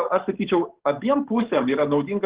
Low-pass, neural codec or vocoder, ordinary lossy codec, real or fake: 3.6 kHz; codec, 44.1 kHz, 7.8 kbps, DAC; Opus, 16 kbps; fake